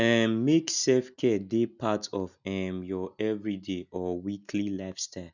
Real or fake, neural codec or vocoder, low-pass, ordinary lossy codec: real; none; 7.2 kHz; none